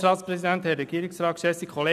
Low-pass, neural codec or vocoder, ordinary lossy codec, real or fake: 14.4 kHz; none; none; real